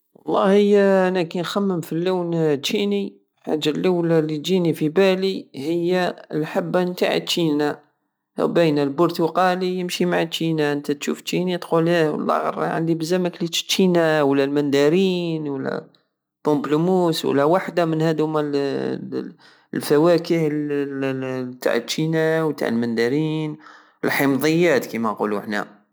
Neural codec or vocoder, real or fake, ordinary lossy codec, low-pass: none; real; none; none